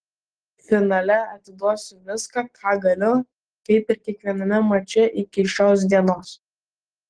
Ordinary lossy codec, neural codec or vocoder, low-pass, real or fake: Opus, 16 kbps; none; 9.9 kHz; real